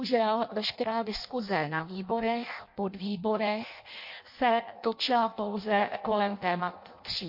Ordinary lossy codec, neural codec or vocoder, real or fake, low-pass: MP3, 32 kbps; codec, 16 kHz in and 24 kHz out, 0.6 kbps, FireRedTTS-2 codec; fake; 5.4 kHz